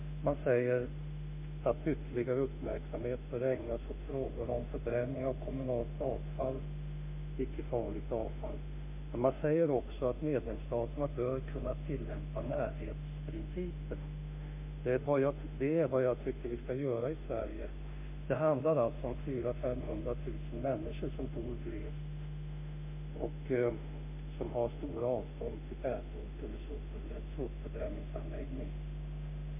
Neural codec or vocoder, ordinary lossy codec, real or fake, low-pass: autoencoder, 48 kHz, 32 numbers a frame, DAC-VAE, trained on Japanese speech; MP3, 32 kbps; fake; 3.6 kHz